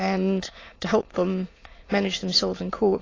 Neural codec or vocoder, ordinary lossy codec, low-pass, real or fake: autoencoder, 22.05 kHz, a latent of 192 numbers a frame, VITS, trained on many speakers; AAC, 32 kbps; 7.2 kHz; fake